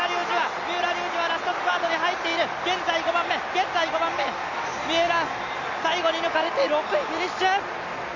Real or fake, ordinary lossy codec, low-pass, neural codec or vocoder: real; none; 7.2 kHz; none